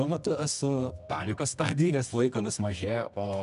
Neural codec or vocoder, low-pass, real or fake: codec, 24 kHz, 0.9 kbps, WavTokenizer, medium music audio release; 10.8 kHz; fake